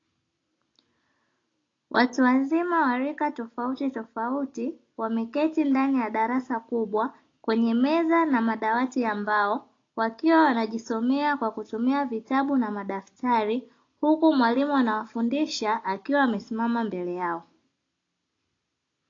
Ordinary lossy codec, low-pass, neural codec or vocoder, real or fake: AAC, 32 kbps; 7.2 kHz; none; real